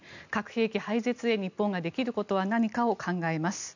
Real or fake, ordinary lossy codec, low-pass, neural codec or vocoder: real; none; 7.2 kHz; none